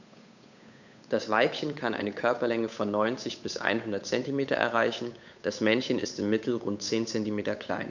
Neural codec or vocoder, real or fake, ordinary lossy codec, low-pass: codec, 16 kHz, 8 kbps, FunCodec, trained on Chinese and English, 25 frames a second; fake; none; 7.2 kHz